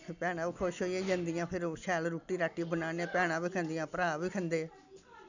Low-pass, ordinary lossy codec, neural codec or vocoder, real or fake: 7.2 kHz; none; none; real